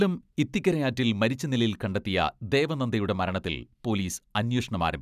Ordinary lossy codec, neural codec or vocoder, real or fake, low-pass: none; none; real; 14.4 kHz